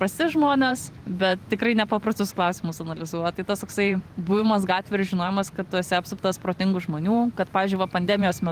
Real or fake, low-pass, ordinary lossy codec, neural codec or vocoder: fake; 14.4 kHz; Opus, 24 kbps; vocoder, 44.1 kHz, 128 mel bands every 512 samples, BigVGAN v2